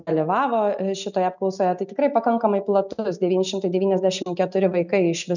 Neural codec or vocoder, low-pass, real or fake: none; 7.2 kHz; real